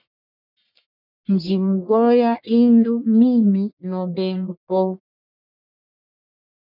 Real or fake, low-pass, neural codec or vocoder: fake; 5.4 kHz; codec, 44.1 kHz, 1.7 kbps, Pupu-Codec